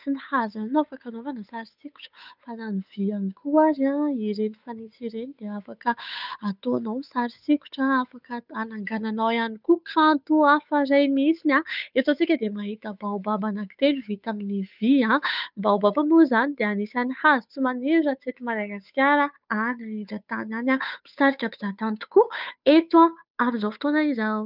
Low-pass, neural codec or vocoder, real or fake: 5.4 kHz; codec, 16 kHz, 8 kbps, FunCodec, trained on Chinese and English, 25 frames a second; fake